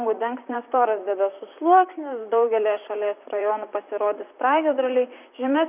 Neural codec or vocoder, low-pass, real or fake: vocoder, 44.1 kHz, 128 mel bands, Pupu-Vocoder; 3.6 kHz; fake